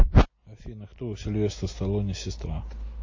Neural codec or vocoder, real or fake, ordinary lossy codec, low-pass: none; real; MP3, 32 kbps; 7.2 kHz